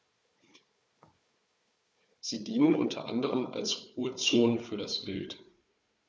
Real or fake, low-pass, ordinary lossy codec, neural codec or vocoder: fake; none; none; codec, 16 kHz, 4 kbps, FunCodec, trained on Chinese and English, 50 frames a second